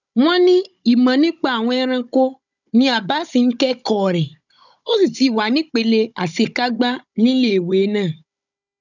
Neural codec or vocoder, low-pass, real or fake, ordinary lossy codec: codec, 16 kHz, 16 kbps, FunCodec, trained on Chinese and English, 50 frames a second; 7.2 kHz; fake; none